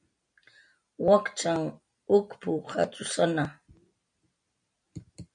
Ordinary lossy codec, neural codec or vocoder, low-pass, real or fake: MP3, 64 kbps; none; 9.9 kHz; real